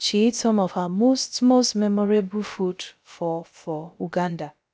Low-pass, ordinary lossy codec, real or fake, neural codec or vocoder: none; none; fake; codec, 16 kHz, about 1 kbps, DyCAST, with the encoder's durations